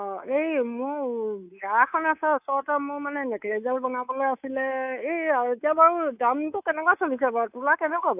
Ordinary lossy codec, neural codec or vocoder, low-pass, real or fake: AAC, 32 kbps; codec, 24 kHz, 3.1 kbps, DualCodec; 3.6 kHz; fake